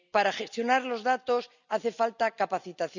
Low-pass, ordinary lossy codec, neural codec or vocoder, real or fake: 7.2 kHz; none; none; real